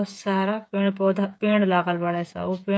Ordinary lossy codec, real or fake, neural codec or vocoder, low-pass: none; fake; codec, 16 kHz, 8 kbps, FreqCodec, smaller model; none